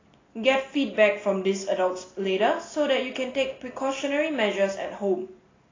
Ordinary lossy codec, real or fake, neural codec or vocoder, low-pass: AAC, 32 kbps; real; none; 7.2 kHz